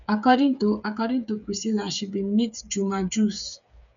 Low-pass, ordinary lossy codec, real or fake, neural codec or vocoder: 7.2 kHz; none; fake; codec, 16 kHz, 8 kbps, FreqCodec, smaller model